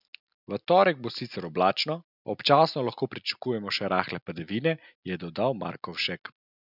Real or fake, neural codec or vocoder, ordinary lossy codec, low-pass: real; none; none; 5.4 kHz